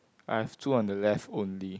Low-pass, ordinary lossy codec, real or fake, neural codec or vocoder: none; none; real; none